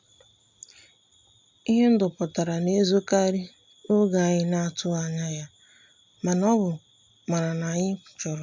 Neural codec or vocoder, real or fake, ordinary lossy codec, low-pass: none; real; MP3, 64 kbps; 7.2 kHz